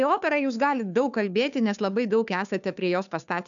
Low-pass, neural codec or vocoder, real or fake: 7.2 kHz; codec, 16 kHz, 2 kbps, FunCodec, trained on Chinese and English, 25 frames a second; fake